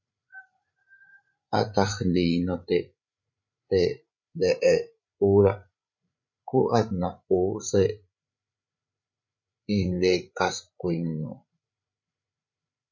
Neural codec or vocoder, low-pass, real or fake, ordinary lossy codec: codec, 16 kHz, 8 kbps, FreqCodec, larger model; 7.2 kHz; fake; MP3, 64 kbps